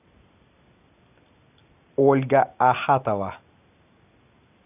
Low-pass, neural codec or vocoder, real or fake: 3.6 kHz; none; real